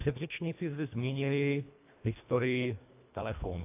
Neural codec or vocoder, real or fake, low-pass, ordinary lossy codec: codec, 24 kHz, 1.5 kbps, HILCodec; fake; 3.6 kHz; AAC, 32 kbps